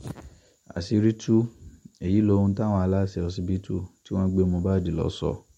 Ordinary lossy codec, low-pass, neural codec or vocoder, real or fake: MP3, 64 kbps; 19.8 kHz; none; real